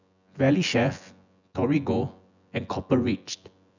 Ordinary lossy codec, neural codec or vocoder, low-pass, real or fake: none; vocoder, 24 kHz, 100 mel bands, Vocos; 7.2 kHz; fake